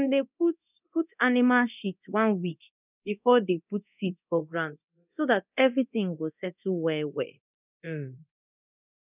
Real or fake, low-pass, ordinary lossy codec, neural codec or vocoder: fake; 3.6 kHz; none; codec, 24 kHz, 0.9 kbps, DualCodec